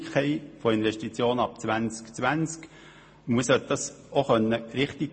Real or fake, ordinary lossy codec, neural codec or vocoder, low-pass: real; MP3, 32 kbps; none; 9.9 kHz